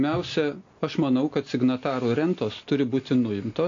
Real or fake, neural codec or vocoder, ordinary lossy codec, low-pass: real; none; AAC, 48 kbps; 7.2 kHz